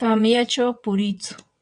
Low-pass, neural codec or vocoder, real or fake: 9.9 kHz; vocoder, 22.05 kHz, 80 mel bands, WaveNeXt; fake